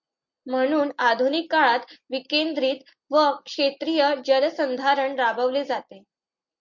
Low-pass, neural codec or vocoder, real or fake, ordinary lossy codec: 7.2 kHz; none; real; MP3, 48 kbps